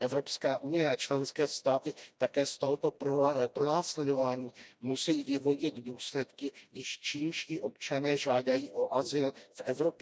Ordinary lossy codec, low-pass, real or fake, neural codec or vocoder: none; none; fake; codec, 16 kHz, 1 kbps, FreqCodec, smaller model